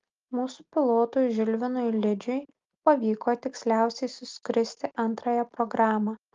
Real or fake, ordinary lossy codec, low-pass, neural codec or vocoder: real; Opus, 32 kbps; 7.2 kHz; none